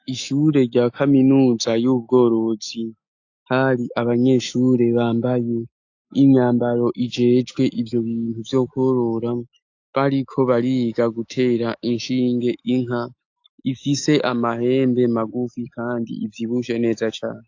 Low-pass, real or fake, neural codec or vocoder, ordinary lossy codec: 7.2 kHz; fake; autoencoder, 48 kHz, 128 numbers a frame, DAC-VAE, trained on Japanese speech; AAC, 48 kbps